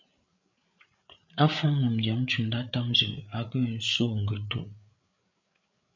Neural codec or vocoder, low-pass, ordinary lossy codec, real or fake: codec, 16 kHz, 8 kbps, FreqCodec, larger model; 7.2 kHz; MP3, 64 kbps; fake